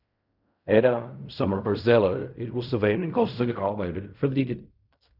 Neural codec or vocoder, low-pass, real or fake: codec, 16 kHz in and 24 kHz out, 0.4 kbps, LongCat-Audio-Codec, fine tuned four codebook decoder; 5.4 kHz; fake